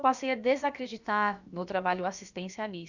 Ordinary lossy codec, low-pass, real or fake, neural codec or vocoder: none; 7.2 kHz; fake; codec, 16 kHz, about 1 kbps, DyCAST, with the encoder's durations